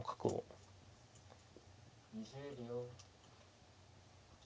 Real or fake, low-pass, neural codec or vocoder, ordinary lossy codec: real; none; none; none